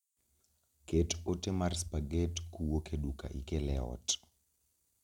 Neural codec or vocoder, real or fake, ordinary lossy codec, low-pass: none; real; none; 19.8 kHz